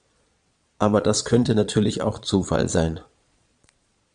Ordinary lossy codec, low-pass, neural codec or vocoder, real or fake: AAC, 96 kbps; 9.9 kHz; vocoder, 22.05 kHz, 80 mel bands, Vocos; fake